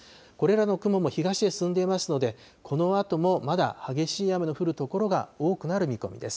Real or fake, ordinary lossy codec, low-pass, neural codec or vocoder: real; none; none; none